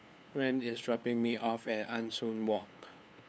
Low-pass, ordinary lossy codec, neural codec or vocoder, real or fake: none; none; codec, 16 kHz, 2 kbps, FunCodec, trained on LibriTTS, 25 frames a second; fake